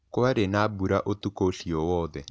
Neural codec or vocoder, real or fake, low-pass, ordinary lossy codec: none; real; none; none